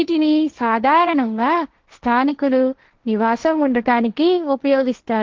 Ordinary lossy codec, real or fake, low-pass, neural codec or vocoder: Opus, 16 kbps; fake; 7.2 kHz; codec, 16 kHz, 1.1 kbps, Voila-Tokenizer